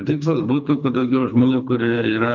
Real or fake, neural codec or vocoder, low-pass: fake; codec, 24 kHz, 3 kbps, HILCodec; 7.2 kHz